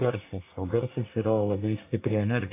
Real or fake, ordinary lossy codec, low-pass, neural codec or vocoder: fake; AAC, 24 kbps; 3.6 kHz; codec, 24 kHz, 1 kbps, SNAC